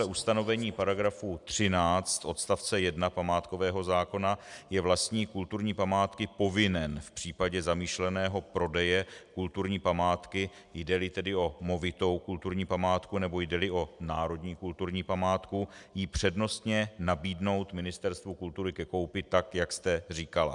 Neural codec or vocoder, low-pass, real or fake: none; 10.8 kHz; real